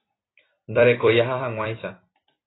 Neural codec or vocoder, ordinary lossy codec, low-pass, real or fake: vocoder, 44.1 kHz, 128 mel bands every 256 samples, BigVGAN v2; AAC, 16 kbps; 7.2 kHz; fake